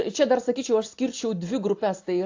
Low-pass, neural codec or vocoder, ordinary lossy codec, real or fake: 7.2 kHz; none; AAC, 48 kbps; real